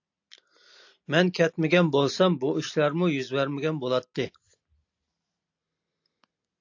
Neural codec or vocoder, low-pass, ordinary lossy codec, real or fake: none; 7.2 kHz; AAC, 48 kbps; real